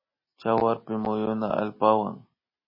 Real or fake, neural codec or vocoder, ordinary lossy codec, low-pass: real; none; MP3, 24 kbps; 5.4 kHz